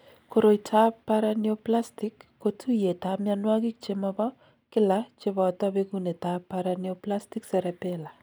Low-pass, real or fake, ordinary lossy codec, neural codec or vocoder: none; real; none; none